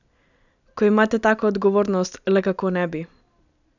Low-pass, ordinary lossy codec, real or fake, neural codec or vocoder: 7.2 kHz; none; real; none